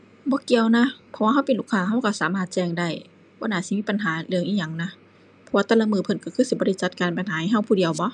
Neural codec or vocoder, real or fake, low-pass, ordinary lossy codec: none; real; 10.8 kHz; none